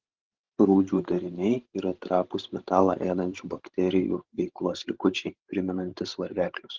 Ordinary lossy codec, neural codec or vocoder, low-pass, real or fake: Opus, 16 kbps; codec, 16 kHz, 16 kbps, FreqCodec, larger model; 7.2 kHz; fake